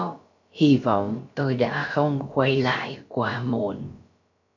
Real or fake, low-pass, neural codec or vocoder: fake; 7.2 kHz; codec, 16 kHz, about 1 kbps, DyCAST, with the encoder's durations